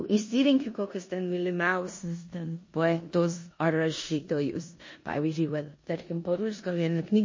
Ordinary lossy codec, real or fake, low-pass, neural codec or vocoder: MP3, 32 kbps; fake; 7.2 kHz; codec, 16 kHz in and 24 kHz out, 0.9 kbps, LongCat-Audio-Codec, four codebook decoder